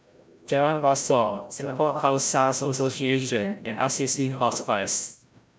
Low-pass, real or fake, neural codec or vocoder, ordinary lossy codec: none; fake; codec, 16 kHz, 0.5 kbps, FreqCodec, larger model; none